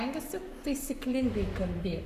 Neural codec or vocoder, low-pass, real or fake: codec, 44.1 kHz, 7.8 kbps, Pupu-Codec; 14.4 kHz; fake